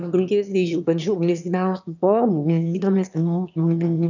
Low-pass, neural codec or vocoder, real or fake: 7.2 kHz; autoencoder, 22.05 kHz, a latent of 192 numbers a frame, VITS, trained on one speaker; fake